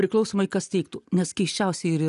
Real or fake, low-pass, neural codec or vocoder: real; 10.8 kHz; none